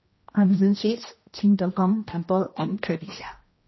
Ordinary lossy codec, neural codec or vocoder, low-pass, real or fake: MP3, 24 kbps; codec, 16 kHz, 1 kbps, X-Codec, HuBERT features, trained on general audio; 7.2 kHz; fake